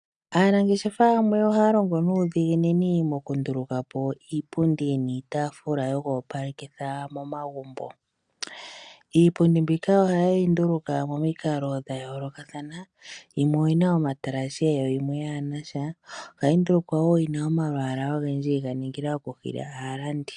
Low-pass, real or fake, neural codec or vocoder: 9.9 kHz; real; none